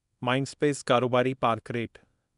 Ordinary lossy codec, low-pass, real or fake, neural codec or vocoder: none; 10.8 kHz; fake; codec, 24 kHz, 0.9 kbps, WavTokenizer, small release